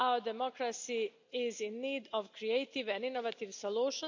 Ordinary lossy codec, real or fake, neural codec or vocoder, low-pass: MP3, 64 kbps; real; none; 7.2 kHz